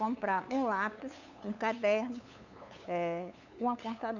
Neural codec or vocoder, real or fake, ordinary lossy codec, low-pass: codec, 16 kHz, 8 kbps, FunCodec, trained on LibriTTS, 25 frames a second; fake; none; 7.2 kHz